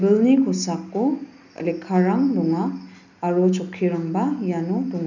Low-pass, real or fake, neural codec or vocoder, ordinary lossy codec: 7.2 kHz; real; none; none